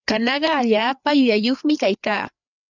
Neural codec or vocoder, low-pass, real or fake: codec, 16 kHz, 4 kbps, FreqCodec, larger model; 7.2 kHz; fake